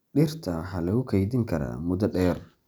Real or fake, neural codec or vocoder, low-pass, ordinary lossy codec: real; none; none; none